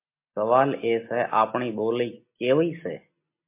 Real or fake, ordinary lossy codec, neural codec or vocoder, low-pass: real; MP3, 24 kbps; none; 3.6 kHz